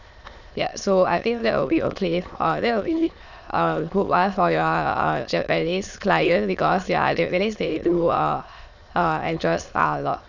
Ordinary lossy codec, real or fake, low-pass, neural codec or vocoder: none; fake; 7.2 kHz; autoencoder, 22.05 kHz, a latent of 192 numbers a frame, VITS, trained on many speakers